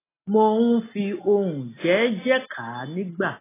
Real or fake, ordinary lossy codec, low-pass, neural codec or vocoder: real; AAC, 16 kbps; 3.6 kHz; none